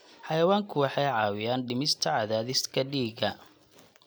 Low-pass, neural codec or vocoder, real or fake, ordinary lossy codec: none; none; real; none